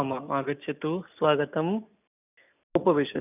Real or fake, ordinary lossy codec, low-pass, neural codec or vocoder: real; none; 3.6 kHz; none